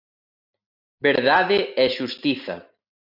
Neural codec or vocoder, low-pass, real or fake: none; 5.4 kHz; real